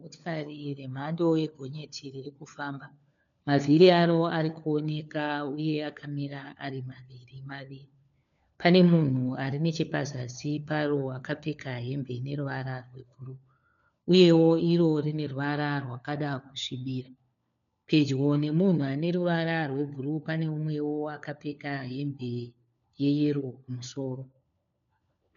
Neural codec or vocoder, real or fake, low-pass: codec, 16 kHz, 4 kbps, FunCodec, trained on LibriTTS, 50 frames a second; fake; 7.2 kHz